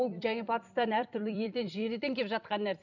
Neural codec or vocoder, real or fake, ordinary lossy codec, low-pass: vocoder, 44.1 kHz, 128 mel bands every 256 samples, BigVGAN v2; fake; none; 7.2 kHz